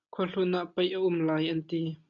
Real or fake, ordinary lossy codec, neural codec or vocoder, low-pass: real; MP3, 96 kbps; none; 7.2 kHz